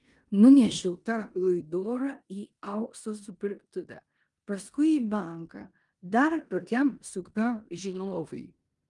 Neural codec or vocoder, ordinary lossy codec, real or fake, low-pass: codec, 16 kHz in and 24 kHz out, 0.9 kbps, LongCat-Audio-Codec, four codebook decoder; Opus, 32 kbps; fake; 10.8 kHz